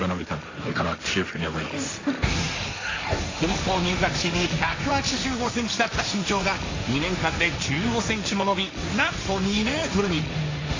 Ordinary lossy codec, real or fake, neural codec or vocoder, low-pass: AAC, 32 kbps; fake; codec, 16 kHz, 1.1 kbps, Voila-Tokenizer; 7.2 kHz